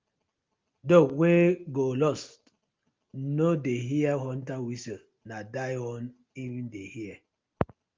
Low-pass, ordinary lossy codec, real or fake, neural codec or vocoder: 7.2 kHz; Opus, 32 kbps; real; none